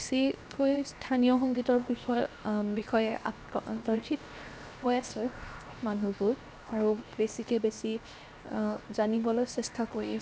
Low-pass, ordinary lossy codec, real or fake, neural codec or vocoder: none; none; fake; codec, 16 kHz, 0.7 kbps, FocalCodec